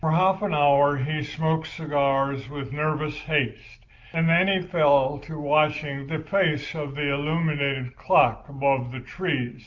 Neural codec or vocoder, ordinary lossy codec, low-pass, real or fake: none; Opus, 32 kbps; 7.2 kHz; real